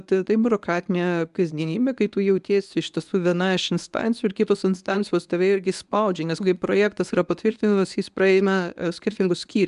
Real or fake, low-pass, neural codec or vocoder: fake; 10.8 kHz; codec, 24 kHz, 0.9 kbps, WavTokenizer, medium speech release version 1